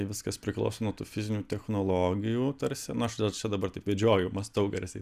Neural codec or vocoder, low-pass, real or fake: none; 14.4 kHz; real